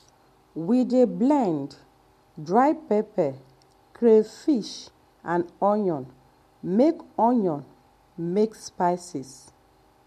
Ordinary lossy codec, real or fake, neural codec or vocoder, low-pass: MP3, 64 kbps; real; none; 14.4 kHz